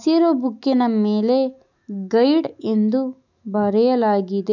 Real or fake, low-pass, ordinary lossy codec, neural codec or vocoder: fake; 7.2 kHz; none; autoencoder, 48 kHz, 128 numbers a frame, DAC-VAE, trained on Japanese speech